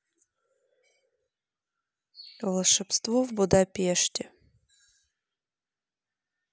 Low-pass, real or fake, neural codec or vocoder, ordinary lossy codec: none; real; none; none